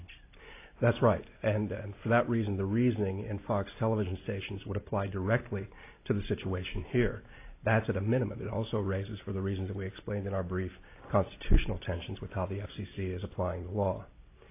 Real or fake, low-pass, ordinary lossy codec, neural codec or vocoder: real; 3.6 kHz; AAC, 24 kbps; none